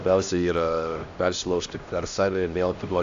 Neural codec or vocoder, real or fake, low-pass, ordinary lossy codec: codec, 16 kHz, 1 kbps, X-Codec, HuBERT features, trained on LibriSpeech; fake; 7.2 kHz; AAC, 64 kbps